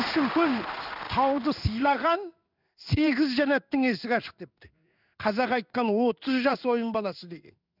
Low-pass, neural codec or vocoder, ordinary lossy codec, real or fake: 5.4 kHz; codec, 16 kHz in and 24 kHz out, 1 kbps, XY-Tokenizer; none; fake